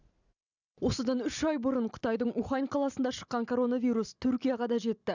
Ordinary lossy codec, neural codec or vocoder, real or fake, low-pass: none; none; real; 7.2 kHz